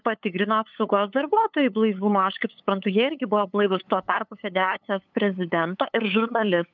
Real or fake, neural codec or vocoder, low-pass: fake; codec, 16 kHz, 8 kbps, FunCodec, trained on LibriTTS, 25 frames a second; 7.2 kHz